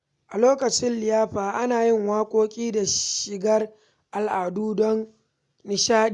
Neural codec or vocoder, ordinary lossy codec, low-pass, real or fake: none; none; 10.8 kHz; real